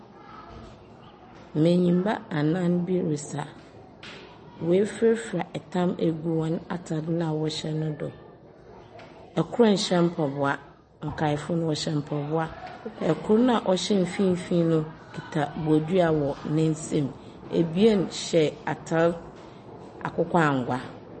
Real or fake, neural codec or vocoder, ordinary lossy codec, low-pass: real; none; MP3, 32 kbps; 10.8 kHz